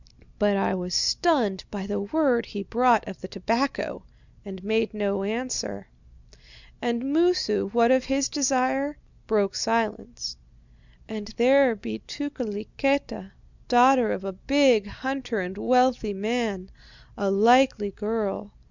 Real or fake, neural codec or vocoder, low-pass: real; none; 7.2 kHz